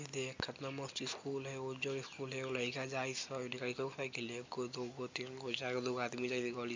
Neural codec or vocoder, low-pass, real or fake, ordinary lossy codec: none; 7.2 kHz; real; MP3, 64 kbps